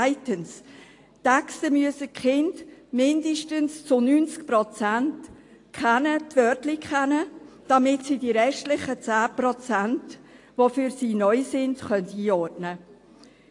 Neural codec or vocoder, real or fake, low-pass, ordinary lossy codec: none; real; 10.8 kHz; AAC, 48 kbps